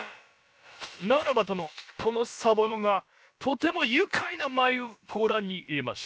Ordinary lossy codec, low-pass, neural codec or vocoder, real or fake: none; none; codec, 16 kHz, about 1 kbps, DyCAST, with the encoder's durations; fake